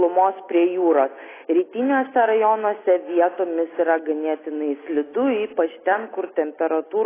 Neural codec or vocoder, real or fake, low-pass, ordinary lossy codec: none; real; 3.6 kHz; AAC, 16 kbps